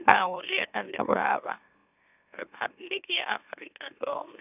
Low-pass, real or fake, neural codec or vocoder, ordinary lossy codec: 3.6 kHz; fake; autoencoder, 44.1 kHz, a latent of 192 numbers a frame, MeloTTS; none